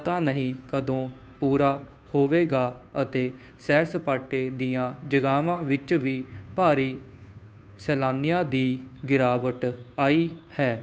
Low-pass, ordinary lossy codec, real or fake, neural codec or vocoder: none; none; fake; codec, 16 kHz, 2 kbps, FunCodec, trained on Chinese and English, 25 frames a second